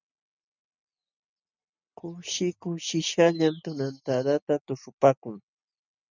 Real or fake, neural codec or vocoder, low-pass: real; none; 7.2 kHz